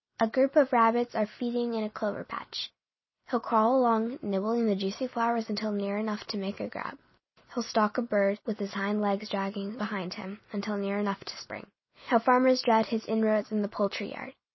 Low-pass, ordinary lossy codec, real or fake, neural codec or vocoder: 7.2 kHz; MP3, 24 kbps; real; none